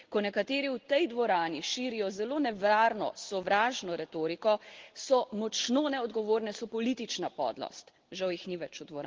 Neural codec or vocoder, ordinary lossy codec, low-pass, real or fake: none; Opus, 16 kbps; 7.2 kHz; real